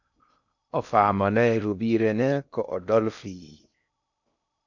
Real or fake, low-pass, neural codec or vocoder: fake; 7.2 kHz; codec, 16 kHz in and 24 kHz out, 0.6 kbps, FocalCodec, streaming, 2048 codes